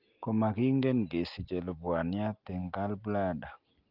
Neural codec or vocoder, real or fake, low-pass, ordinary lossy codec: none; real; 5.4 kHz; Opus, 24 kbps